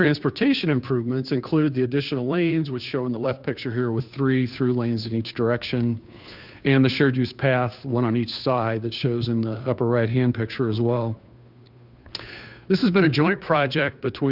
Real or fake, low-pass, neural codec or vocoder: fake; 5.4 kHz; codec, 16 kHz, 2 kbps, FunCodec, trained on Chinese and English, 25 frames a second